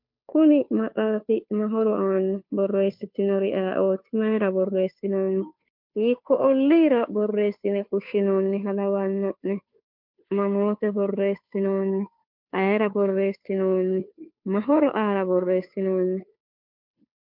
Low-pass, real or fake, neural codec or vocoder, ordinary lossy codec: 5.4 kHz; fake; codec, 16 kHz, 2 kbps, FunCodec, trained on Chinese and English, 25 frames a second; MP3, 48 kbps